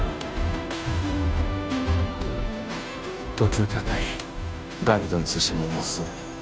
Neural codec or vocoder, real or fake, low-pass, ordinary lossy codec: codec, 16 kHz, 0.5 kbps, FunCodec, trained on Chinese and English, 25 frames a second; fake; none; none